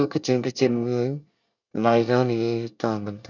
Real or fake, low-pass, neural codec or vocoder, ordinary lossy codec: fake; 7.2 kHz; codec, 24 kHz, 1 kbps, SNAC; none